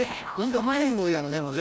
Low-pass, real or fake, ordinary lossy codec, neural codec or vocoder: none; fake; none; codec, 16 kHz, 0.5 kbps, FreqCodec, larger model